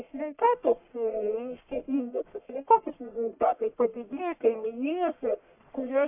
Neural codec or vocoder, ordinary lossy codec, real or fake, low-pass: codec, 44.1 kHz, 1.7 kbps, Pupu-Codec; AAC, 32 kbps; fake; 3.6 kHz